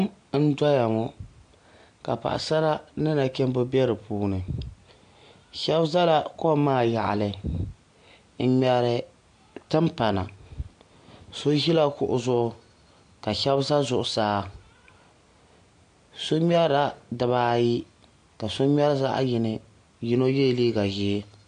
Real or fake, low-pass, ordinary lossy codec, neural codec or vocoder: real; 9.9 kHz; AAC, 64 kbps; none